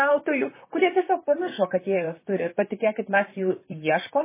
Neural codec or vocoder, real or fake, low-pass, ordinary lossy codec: vocoder, 44.1 kHz, 128 mel bands, Pupu-Vocoder; fake; 3.6 kHz; MP3, 16 kbps